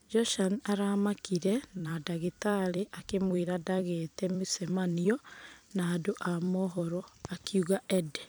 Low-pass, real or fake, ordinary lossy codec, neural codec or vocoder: none; fake; none; vocoder, 44.1 kHz, 128 mel bands every 256 samples, BigVGAN v2